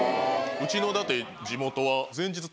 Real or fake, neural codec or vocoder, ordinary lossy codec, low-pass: real; none; none; none